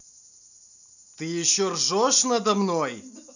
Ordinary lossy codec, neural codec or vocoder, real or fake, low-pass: none; none; real; 7.2 kHz